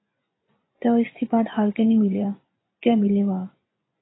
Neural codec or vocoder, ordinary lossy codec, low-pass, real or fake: vocoder, 24 kHz, 100 mel bands, Vocos; AAC, 16 kbps; 7.2 kHz; fake